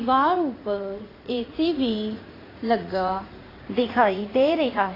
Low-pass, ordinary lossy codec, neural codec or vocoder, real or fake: 5.4 kHz; AAC, 24 kbps; vocoder, 44.1 kHz, 80 mel bands, Vocos; fake